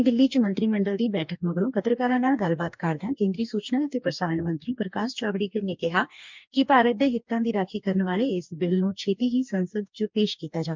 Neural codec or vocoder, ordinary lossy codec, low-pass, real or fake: codec, 44.1 kHz, 2.6 kbps, DAC; MP3, 64 kbps; 7.2 kHz; fake